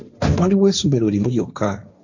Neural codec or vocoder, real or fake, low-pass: codec, 16 kHz, 1.1 kbps, Voila-Tokenizer; fake; 7.2 kHz